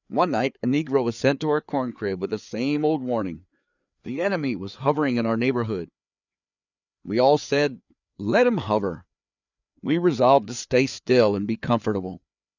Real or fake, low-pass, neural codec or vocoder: fake; 7.2 kHz; codec, 16 kHz, 4 kbps, FreqCodec, larger model